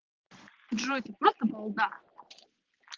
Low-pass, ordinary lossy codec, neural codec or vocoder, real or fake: 7.2 kHz; Opus, 16 kbps; none; real